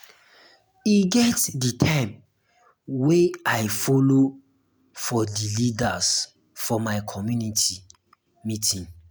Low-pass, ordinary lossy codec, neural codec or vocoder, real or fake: none; none; none; real